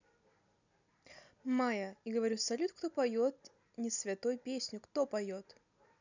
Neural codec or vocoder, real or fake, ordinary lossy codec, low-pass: none; real; none; 7.2 kHz